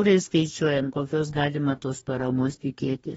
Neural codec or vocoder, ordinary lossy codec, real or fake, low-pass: codec, 44.1 kHz, 2.6 kbps, DAC; AAC, 24 kbps; fake; 19.8 kHz